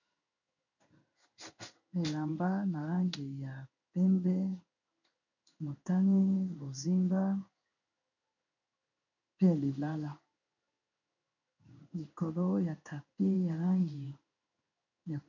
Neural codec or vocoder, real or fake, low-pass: codec, 16 kHz in and 24 kHz out, 1 kbps, XY-Tokenizer; fake; 7.2 kHz